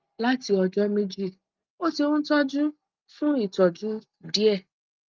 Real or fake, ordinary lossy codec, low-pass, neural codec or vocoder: real; Opus, 24 kbps; 7.2 kHz; none